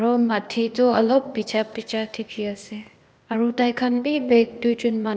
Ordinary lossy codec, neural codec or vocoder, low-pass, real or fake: none; codec, 16 kHz, 0.8 kbps, ZipCodec; none; fake